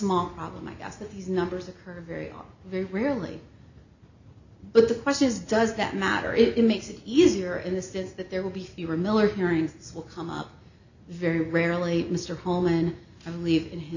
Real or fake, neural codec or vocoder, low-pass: real; none; 7.2 kHz